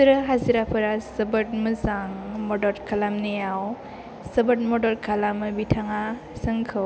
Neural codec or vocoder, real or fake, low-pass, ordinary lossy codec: none; real; none; none